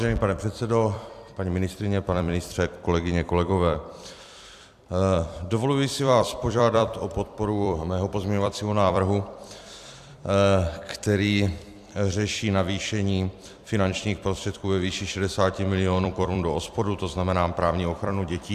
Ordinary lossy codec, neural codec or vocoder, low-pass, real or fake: AAC, 96 kbps; vocoder, 44.1 kHz, 128 mel bands every 256 samples, BigVGAN v2; 14.4 kHz; fake